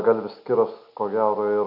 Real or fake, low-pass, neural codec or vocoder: real; 5.4 kHz; none